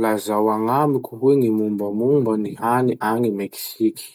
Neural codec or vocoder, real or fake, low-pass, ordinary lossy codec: none; real; none; none